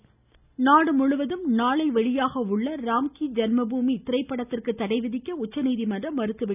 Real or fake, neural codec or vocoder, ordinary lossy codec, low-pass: real; none; none; 3.6 kHz